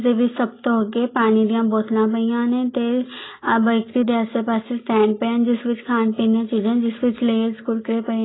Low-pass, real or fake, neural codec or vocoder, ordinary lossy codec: 7.2 kHz; real; none; AAC, 16 kbps